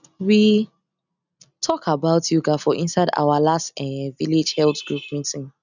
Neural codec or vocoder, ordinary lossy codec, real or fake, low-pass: none; none; real; 7.2 kHz